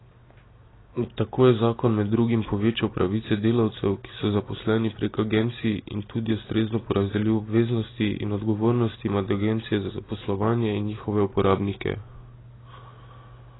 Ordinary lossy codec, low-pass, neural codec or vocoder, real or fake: AAC, 16 kbps; 7.2 kHz; none; real